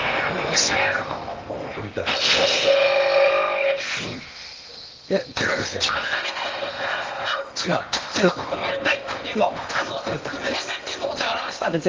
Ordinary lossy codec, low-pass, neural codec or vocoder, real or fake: Opus, 32 kbps; 7.2 kHz; codec, 16 kHz in and 24 kHz out, 0.8 kbps, FocalCodec, streaming, 65536 codes; fake